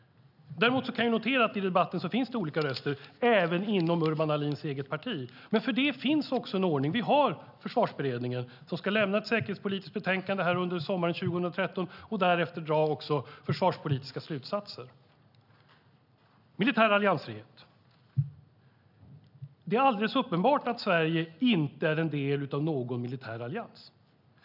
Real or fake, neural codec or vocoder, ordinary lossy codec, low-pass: real; none; none; 5.4 kHz